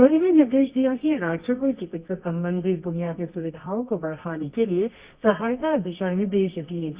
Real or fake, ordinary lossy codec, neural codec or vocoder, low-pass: fake; Opus, 64 kbps; codec, 24 kHz, 0.9 kbps, WavTokenizer, medium music audio release; 3.6 kHz